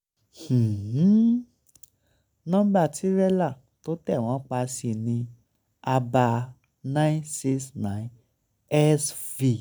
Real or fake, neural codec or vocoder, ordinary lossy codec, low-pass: real; none; none; none